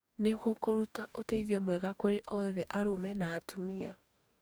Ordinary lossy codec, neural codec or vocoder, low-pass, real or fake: none; codec, 44.1 kHz, 2.6 kbps, DAC; none; fake